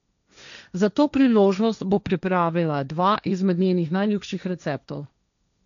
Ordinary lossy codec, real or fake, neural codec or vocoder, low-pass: none; fake; codec, 16 kHz, 1.1 kbps, Voila-Tokenizer; 7.2 kHz